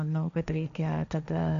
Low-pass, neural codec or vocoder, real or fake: 7.2 kHz; codec, 16 kHz, 1.1 kbps, Voila-Tokenizer; fake